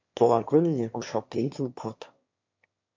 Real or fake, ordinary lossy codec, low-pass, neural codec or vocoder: fake; MP3, 48 kbps; 7.2 kHz; autoencoder, 22.05 kHz, a latent of 192 numbers a frame, VITS, trained on one speaker